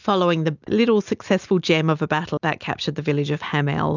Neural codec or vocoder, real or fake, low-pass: none; real; 7.2 kHz